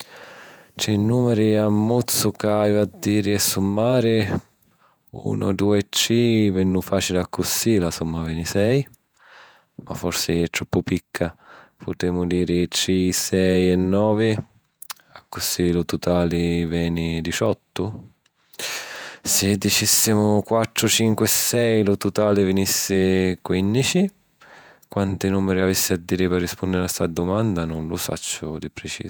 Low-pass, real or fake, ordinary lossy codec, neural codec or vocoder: none; fake; none; vocoder, 48 kHz, 128 mel bands, Vocos